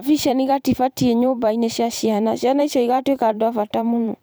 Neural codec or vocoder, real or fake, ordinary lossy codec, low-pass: vocoder, 44.1 kHz, 128 mel bands every 512 samples, BigVGAN v2; fake; none; none